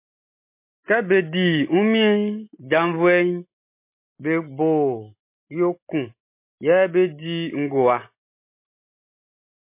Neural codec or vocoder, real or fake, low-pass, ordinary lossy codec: none; real; 3.6 kHz; MP3, 24 kbps